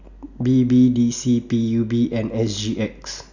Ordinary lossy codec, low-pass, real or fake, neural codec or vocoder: none; 7.2 kHz; real; none